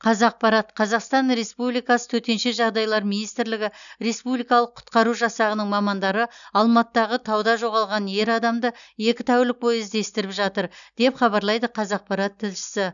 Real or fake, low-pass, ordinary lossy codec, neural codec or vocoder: real; 7.2 kHz; none; none